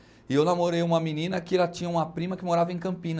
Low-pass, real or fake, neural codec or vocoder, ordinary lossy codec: none; real; none; none